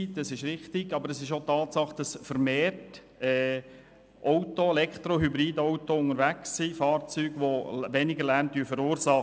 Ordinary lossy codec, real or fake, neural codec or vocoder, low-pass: none; real; none; none